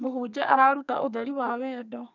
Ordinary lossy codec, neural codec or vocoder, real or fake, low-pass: none; codec, 32 kHz, 1.9 kbps, SNAC; fake; 7.2 kHz